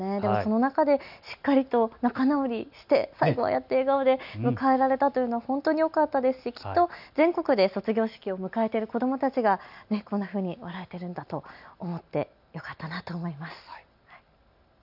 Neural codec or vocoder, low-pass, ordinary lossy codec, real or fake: none; 5.4 kHz; none; real